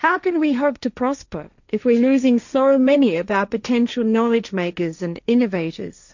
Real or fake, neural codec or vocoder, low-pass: fake; codec, 16 kHz, 1.1 kbps, Voila-Tokenizer; 7.2 kHz